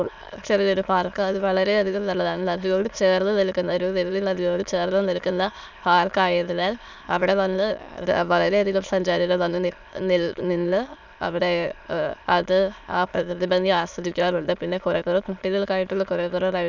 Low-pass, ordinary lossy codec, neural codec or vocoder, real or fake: 7.2 kHz; none; autoencoder, 22.05 kHz, a latent of 192 numbers a frame, VITS, trained on many speakers; fake